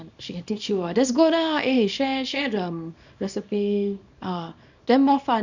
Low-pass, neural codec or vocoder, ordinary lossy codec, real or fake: 7.2 kHz; codec, 24 kHz, 0.9 kbps, WavTokenizer, small release; none; fake